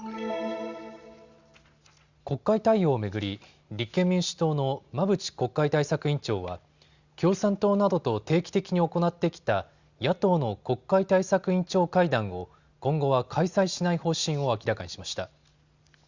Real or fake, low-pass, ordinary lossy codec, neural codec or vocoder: real; 7.2 kHz; Opus, 64 kbps; none